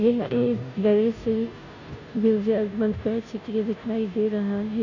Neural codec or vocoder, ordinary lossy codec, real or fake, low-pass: codec, 16 kHz, 0.5 kbps, FunCodec, trained on Chinese and English, 25 frames a second; none; fake; 7.2 kHz